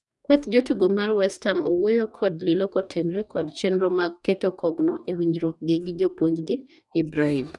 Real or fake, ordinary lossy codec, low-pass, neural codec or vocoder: fake; none; 10.8 kHz; codec, 44.1 kHz, 2.6 kbps, DAC